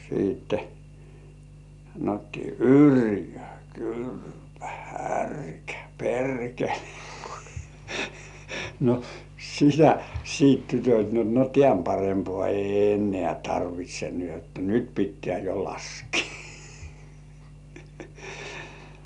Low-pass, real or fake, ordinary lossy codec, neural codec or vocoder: 10.8 kHz; real; none; none